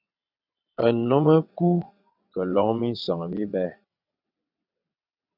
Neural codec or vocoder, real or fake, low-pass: vocoder, 22.05 kHz, 80 mel bands, WaveNeXt; fake; 5.4 kHz